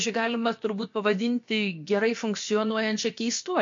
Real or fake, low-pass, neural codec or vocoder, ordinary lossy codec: fake; 7.2 kHz; codec, 16 kHz, about 1 kbps, DyCAST, with the encoder's durations; MP3, 48 kbps